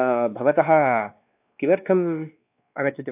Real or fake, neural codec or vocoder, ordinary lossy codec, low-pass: fake; codec, 16 kHz, 2 kbps, X-Codec, WavLM features, trained on Multilingual LibriSpeech; AAC, 32 kbps; 3.6 kHz